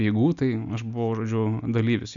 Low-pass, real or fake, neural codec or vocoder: 7.2 kHz; real; none